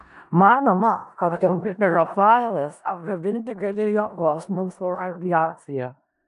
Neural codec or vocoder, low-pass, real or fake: codec, 16 kHz in and 24 kHz out, 0.4 kbps, LongCat-Audio-Codec, four codebook decoder; 10.8 kHz; fake